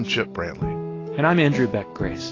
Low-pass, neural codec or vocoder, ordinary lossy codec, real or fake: 7.2 kHz; none; AAC, 32 kbps; real